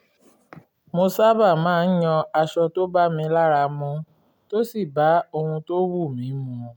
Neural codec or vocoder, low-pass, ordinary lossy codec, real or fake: none; 19.8 kHz; none; real